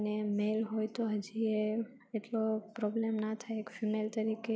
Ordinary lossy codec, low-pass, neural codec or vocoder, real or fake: none; none; none; real